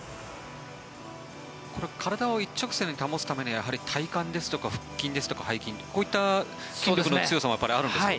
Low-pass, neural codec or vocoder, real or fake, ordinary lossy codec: none; none; real; none